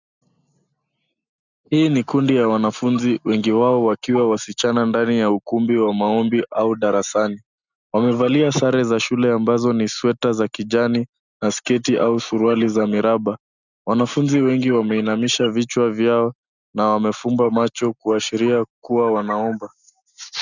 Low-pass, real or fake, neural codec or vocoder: 7.2 kHz; real; none